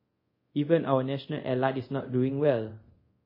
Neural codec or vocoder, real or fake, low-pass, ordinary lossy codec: codec, 24 kHz, 0.5 kbps, DualCodec; fake; 5.4 kHz; MP3, 24 kbps